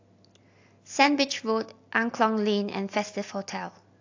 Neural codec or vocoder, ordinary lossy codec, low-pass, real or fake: none; AAC, 48 kbps; 7.2 kHz; real